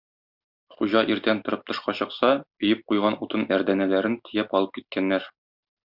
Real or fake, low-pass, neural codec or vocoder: real; 5.4 kHz; none